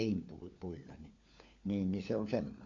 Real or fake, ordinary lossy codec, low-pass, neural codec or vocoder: fake; MP3, 48 kbps; 7.2 kHz; codec, 16 kHz, 4 kbps, FunCodec, trained on LibriTTS, 50 frames a second